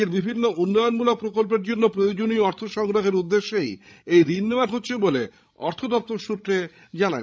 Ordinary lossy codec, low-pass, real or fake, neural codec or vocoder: none; none; fake; codec, 16 kHz, 16 kbps, FreqCodec, larger model